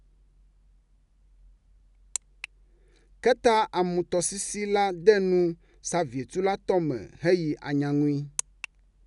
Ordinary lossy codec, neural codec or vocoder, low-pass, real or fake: none; none; 10.8 kHz; real